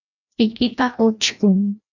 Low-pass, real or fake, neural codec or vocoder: 7.2 kHz; fake; codec, 16 kHz, 1 kbps, FreqCodec, larger model